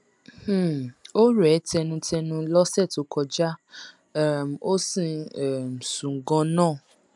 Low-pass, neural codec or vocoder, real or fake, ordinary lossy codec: 10.8 kHz; none; real; none